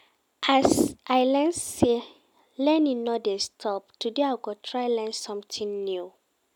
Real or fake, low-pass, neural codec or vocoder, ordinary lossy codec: real; 19.8 kHz; none; none